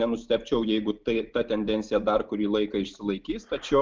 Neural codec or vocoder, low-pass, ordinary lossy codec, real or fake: none; 7.2 kHz; Opus, 32 kbps; real